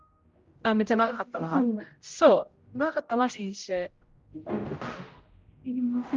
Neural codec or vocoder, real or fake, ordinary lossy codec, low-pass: codec, 16 kHz, 0.5 kbps, X-Codec, HuBERT features, trained on general audio; fake; Opus, 32 kbps; 7.2 kHz